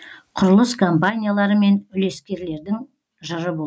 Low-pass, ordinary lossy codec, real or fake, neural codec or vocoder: none; none; real; none